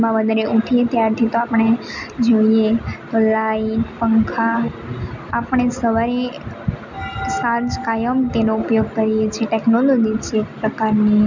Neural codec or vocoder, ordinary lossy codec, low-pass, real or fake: none; none; 7.2 kHz; real